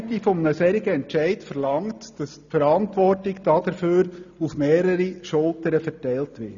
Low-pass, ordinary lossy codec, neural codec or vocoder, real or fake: 7.2 kHz; none; none; real